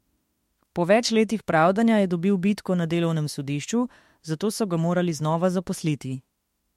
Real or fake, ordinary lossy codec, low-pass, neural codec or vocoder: fake; MP3, 64 kbps; 19.8 kHz; autoencoder, 48 kHz, 32 numbers a frame, DAC-VAE, trained on Japanese speech